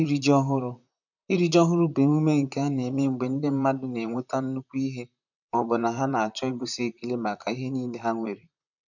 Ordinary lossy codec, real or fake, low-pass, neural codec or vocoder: none; fake; 7.2 kHz; vocoder, 44.1 kHz, 128 mel bands, Pupu-Vocoder